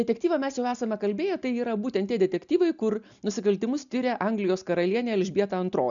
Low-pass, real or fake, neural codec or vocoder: 7.2 kHz; real; none